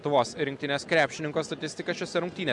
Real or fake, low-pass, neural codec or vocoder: real; 10.8 kHz; none